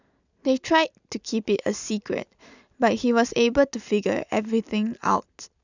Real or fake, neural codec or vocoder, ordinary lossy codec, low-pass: real; none; none; 7.2 kHz